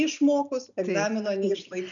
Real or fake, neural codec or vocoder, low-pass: real; none; 7.2 kHz